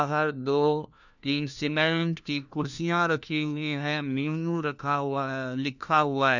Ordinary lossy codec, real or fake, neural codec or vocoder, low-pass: none; fake; codec, 16 kHz, 1 kbps, FunCodec, trained on LibriTTS, 50 frames a second; 7.2 kHz